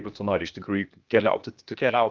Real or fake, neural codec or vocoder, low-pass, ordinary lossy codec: fake; codec, 16 kHz, 0.8 kbps, ZipCodec; 7.2 kHz; Opus, 32 kbps